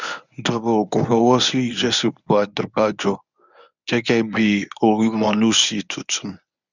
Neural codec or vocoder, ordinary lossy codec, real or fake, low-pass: codec, 24 kHz, 0.9 kbps, WavTokenizer, medium speech release version 1; none; fake; 7.2 kHz